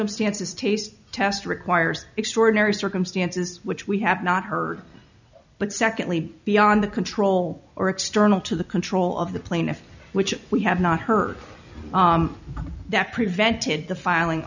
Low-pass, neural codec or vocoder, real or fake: 7.2 kHz; none; real